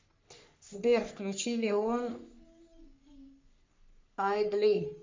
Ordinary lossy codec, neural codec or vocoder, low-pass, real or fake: MP3, 64 kbps; codec, 44.1 kHz, 3.4 kbps, Pupu-Codec; 7.2 kHz; fake